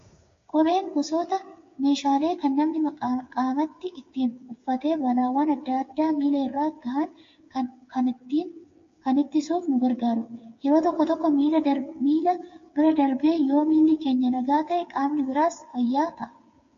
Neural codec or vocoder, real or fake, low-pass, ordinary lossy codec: codec, 16 kHz, 8 kbps, FreqCodec, smaller model; fake; 7.2 kHz; AAC, 48 kbps